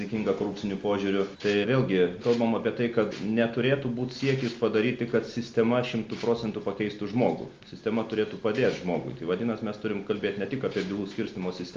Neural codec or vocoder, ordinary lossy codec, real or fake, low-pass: none; Opus, 32 kbps; real; 7.2 kHz